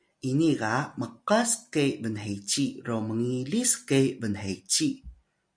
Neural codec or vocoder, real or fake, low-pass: none; real; 9.9 kHz